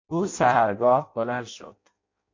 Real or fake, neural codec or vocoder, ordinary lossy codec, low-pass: fake; codec, 16 kHz in and 24 kHz out, 0.6 kbps, FireRedTTS-2 codec; MP3, 48 kbps; 7.2 kHz